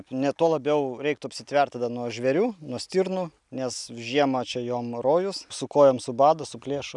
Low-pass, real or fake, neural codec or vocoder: 10.8 kHz; real; none